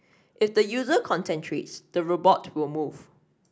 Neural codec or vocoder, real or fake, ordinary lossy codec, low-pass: none; real; none; none